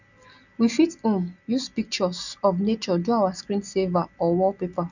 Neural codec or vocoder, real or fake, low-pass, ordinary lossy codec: none; real; 7.2 kHz; none